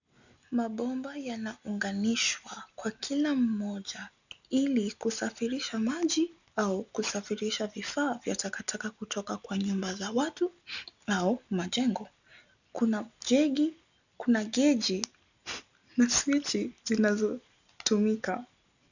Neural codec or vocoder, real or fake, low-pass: none; real; 7.2 kHz